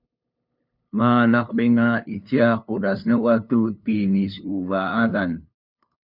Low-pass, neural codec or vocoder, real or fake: 5.4 kHz; codec, 16 kHz, 2 kbps, FunCodec, trained on LibriTTS, 25 frames a second; fake